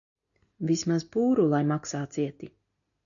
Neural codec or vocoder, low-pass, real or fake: none; 7.2 kHz; real